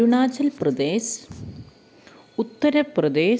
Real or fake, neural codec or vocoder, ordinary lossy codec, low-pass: real; none; none; none